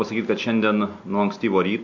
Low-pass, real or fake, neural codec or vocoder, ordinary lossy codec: 7.2 kHz; real; none; MP3, 48 kbps